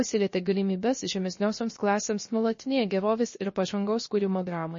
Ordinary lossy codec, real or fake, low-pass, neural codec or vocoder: MP3, 32 kbps; fake; 7.2 kHz; codec, 16 kHz, 0.3 kbps, FocalCodec